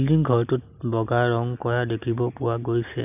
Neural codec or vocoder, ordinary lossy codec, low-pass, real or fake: none; none; 3.6 kHz; real